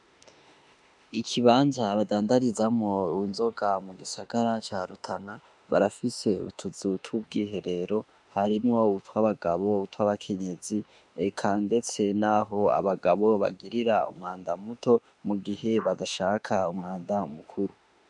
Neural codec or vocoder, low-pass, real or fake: autoencoder, 48 kHz, 32 numbers a frame, DAC-VAE, trained on Japanese speech; 10.8 kHz; fake